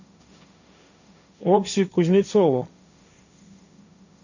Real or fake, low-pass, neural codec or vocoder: fake; 7.2 kHz; codec, 16 kHz, 1.1 kbps, Voila-Tokenizer